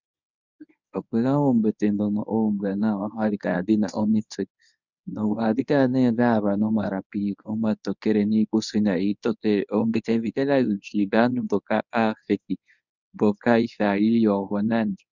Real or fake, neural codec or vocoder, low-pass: fake; codec, 24 kHz, 0.9 kbps, WavTokenizer, medium speech release version 1; 7.2 kHz